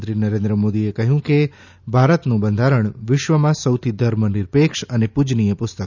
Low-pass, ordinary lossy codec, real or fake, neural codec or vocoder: 7.2 kHz; none; real; none